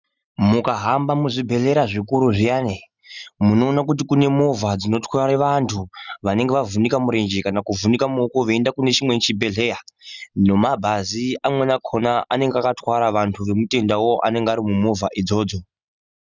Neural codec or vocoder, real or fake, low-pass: none; real; 7.2 kHz